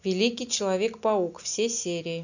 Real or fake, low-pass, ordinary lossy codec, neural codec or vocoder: real; 7.2 kHz; none; none